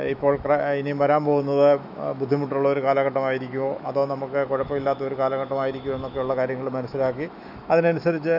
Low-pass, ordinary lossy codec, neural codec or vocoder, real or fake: 5.4 kHz; none; autoencoder, 48 kHz, 128 numbers a frame, DAC-VAE, trained on Japanese speech; fake